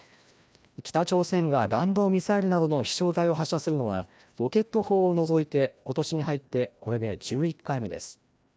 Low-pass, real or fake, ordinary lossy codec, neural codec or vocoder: none; fake; none; codec, 16 kHz, 1 kbps, FreqCodec, larger model